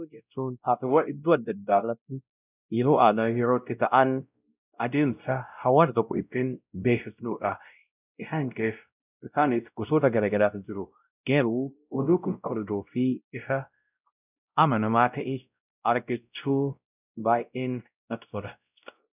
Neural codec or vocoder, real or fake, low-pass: codec, 16 kHz, 0.5 kbps, X-Codec, WavLM features, trained on Multilingual LibriSpeech; fake; 3.6 kHz